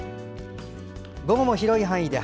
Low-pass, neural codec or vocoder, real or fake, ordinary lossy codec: none; none; real; none